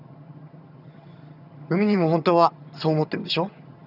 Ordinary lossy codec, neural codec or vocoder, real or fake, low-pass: none; vocoder, 22.05 kHz, 80 mel bands, HiFi-GAN; fake; 5.4 kHz